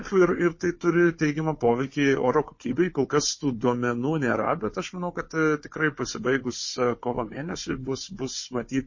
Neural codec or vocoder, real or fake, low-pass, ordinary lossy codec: codec, 16 kHz, 4 kbps, FunCodec, trained on Chinese and English, 50 frames a second; fake; 7.2 kHz; MP3, 32 kbps